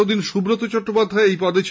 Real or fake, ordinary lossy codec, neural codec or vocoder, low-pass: real; none; none; none